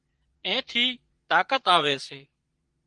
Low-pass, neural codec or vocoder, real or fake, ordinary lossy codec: 9.9 kHz; vocoder, 22.05 kHz, 80 mel bands, Vocos; fake; Opus, 24 kbps